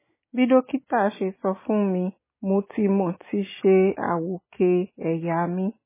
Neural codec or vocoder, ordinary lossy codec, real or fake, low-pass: none; MP3, 16 kbps; real; 3.6 kHz